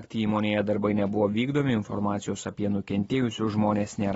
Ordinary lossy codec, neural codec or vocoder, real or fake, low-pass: AAC, 24 kbps; none; real; 19.8 kHz